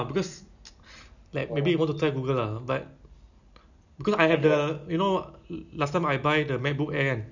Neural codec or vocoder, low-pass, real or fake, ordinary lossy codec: none; 7.2 kHz; real; none